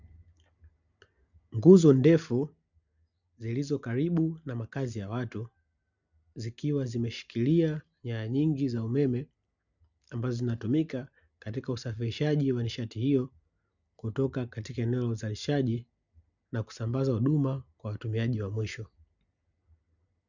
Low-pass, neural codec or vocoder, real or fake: 7.2 kHz; none; real